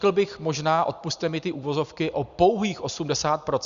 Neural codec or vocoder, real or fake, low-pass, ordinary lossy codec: none; real; 7.2 kHz; Opus, 64 kbps